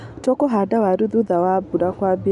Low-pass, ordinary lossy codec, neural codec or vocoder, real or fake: 10.8 kHz; none; none; real